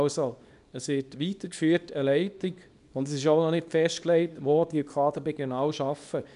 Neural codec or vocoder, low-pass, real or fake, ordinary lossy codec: codec, 24 kHz, 0.9 kbps, WavTokenizer, small release; 10.8 kHz; fake; none